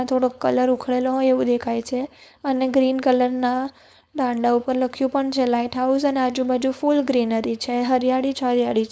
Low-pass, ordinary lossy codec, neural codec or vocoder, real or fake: none; none; codec, 16 kHz, 4.8 kbps, FACodec; fake